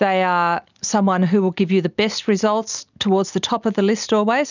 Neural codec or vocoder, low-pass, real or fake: none; 7.2 kHz; real